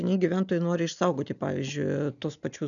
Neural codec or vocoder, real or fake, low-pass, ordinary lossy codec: none; real; 7.2 kHz; MP3, 96 kbps